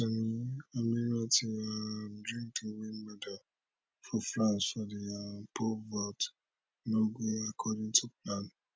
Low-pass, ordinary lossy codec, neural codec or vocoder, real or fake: none; none; none; real